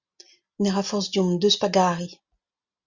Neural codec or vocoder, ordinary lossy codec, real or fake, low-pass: none; Opus, 64 kbps; real; 7.2 kHz